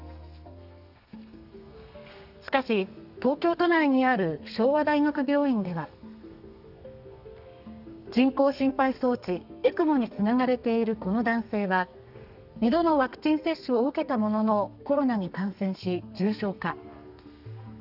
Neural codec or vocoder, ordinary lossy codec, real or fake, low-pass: codec, 32 kHz, 1.9 kbps, SNAC; none; fake; 5.4 kHz